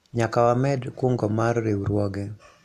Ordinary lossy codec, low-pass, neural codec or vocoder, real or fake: AAC, 64 kbps; 14.4 kHz; none; real